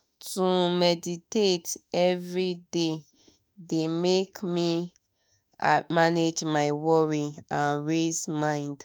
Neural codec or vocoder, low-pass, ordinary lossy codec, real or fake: autoencoder, 48 kHz, 32 numbers a frame, DAC-VAE, trained on Japanese speech; none; none; fake